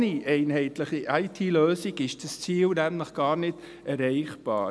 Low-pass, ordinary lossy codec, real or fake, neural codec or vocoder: none; none; real; none